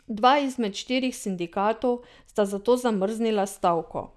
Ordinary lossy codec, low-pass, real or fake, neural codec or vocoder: none; none; real; none